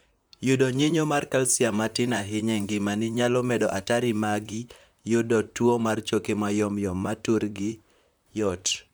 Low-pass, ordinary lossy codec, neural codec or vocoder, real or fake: none; none; vocoder, 44.1 kHz, 128 mel bands, Pupu-Vocoder; fake